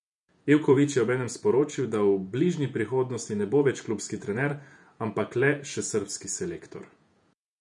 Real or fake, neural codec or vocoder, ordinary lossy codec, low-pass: fake; vocoder, 48 kHz, 128 mel bands, Vocos; none; 10.8 kHz